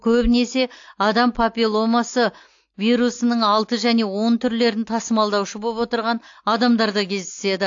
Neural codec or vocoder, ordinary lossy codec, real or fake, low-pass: none; AAC, 48 kbps; real; 7.2 kHz